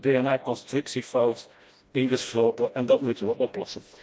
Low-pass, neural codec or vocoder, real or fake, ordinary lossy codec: none; codec, 16 kHz, 1 kbps, FreqCodec, smaller model; fake; none